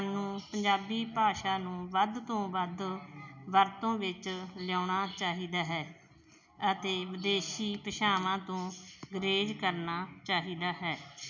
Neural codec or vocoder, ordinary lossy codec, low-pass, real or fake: none; none; 7.2 kHz; real